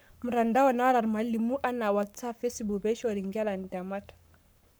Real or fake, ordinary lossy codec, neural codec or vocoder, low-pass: fake; none; codec, 44.1 kHz, 7.8 kbps, Pupu-Codec; none